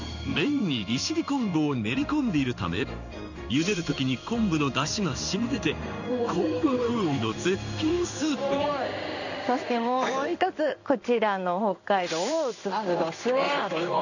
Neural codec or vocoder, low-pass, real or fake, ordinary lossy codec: codec, 16 kHz in and 24 kHz out, 1 kbps, XY-Tokenizer; 7.2 kHz; fake; none